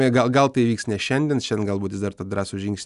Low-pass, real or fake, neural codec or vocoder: 10.8 kHz; real; none